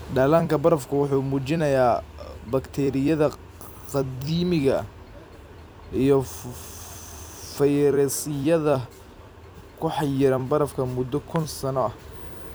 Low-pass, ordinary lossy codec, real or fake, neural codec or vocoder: none; none; fake; vocoder, 44.1 kHz, 128 mel bands every 256 samples, BigVGAN v2